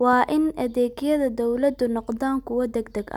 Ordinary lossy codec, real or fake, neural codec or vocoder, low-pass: none; real; none; 19.8 kHz